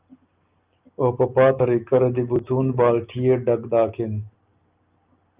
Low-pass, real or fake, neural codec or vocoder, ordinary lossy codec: 3.6 kHz; real; none; Opus, 24 kbps